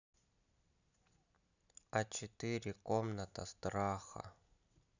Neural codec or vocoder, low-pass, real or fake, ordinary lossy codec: none; 7.2 kHz; real; none